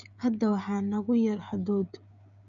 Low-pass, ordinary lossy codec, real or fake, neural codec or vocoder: 7.2 kHz; none; fake; codec, 16 kHz, 16 kbps, FreqCodec, smaller model